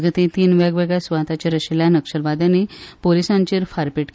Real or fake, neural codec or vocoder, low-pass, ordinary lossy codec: real; none; none; none